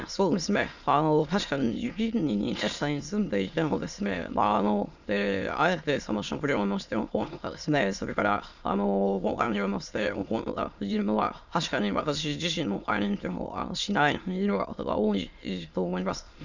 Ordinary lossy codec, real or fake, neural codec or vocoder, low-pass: none; fake; autoencoder, 22.05 kHz, a latent of 192 numbers a frame, VITS, trained on many speakers; 7.2 kHz